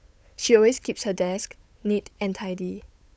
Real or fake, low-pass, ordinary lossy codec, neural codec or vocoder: fake; none; none; codec, 16 kHz, 8 kbps, FunCodec, trained on Chinese and English, 25 frames a second